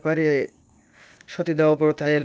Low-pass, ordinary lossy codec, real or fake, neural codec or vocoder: none; none; fake; codec, 16 kHz, 0.8 kbps, ZipCodec